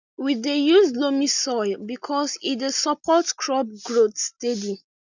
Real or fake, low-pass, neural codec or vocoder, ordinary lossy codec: real; 7.2 kHz; none; none